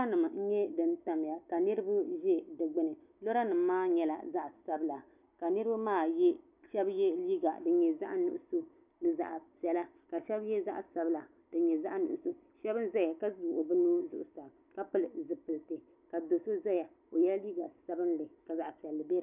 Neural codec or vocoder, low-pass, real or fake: none; 3.6 kHz; real